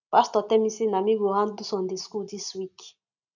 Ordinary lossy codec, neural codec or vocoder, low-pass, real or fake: none; none; 7.2 kHz; real